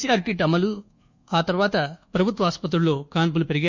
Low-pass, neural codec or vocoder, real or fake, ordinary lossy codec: 7.2 kHz; codec, 24 kHz, 1.2 kbps, DualCodec; fake; Opus, 64 kbps